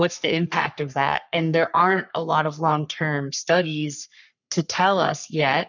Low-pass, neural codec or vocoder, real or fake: 7.2 kHz; codec, 44.1 kHz, 3.4 kbps, Pupu-Codec; fake